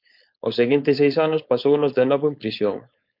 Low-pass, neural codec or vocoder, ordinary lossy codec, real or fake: 5.4 kHz; codec, 16 kHz, 4.8 kbps, FACodec; MP3, 48 kbps; fake